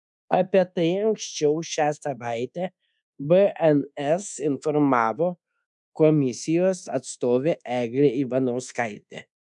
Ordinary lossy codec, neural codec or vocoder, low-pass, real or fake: MP3, 96 kbps; codec, 24 kHz, 1.2 kbps, DualCodec; 10.8 kHz; fake